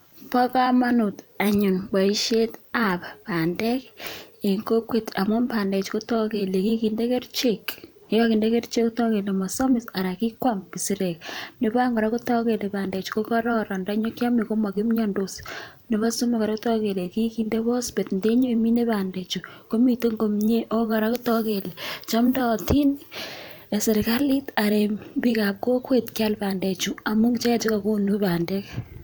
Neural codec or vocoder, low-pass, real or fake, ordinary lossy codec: vocoder, 44.1 kHz, 128 mel bands every 512 samples, BigVGAN v2; none; fake; none